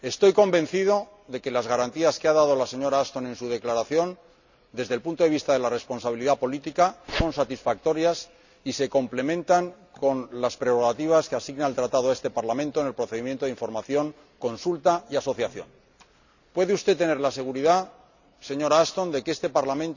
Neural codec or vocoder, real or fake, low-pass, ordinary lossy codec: none; real; 7.2 kHz; none